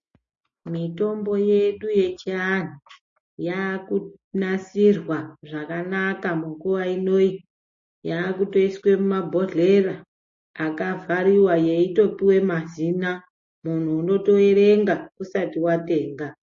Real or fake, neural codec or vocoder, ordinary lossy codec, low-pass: real; none; MP3, 32 kbps; 7.2 kHz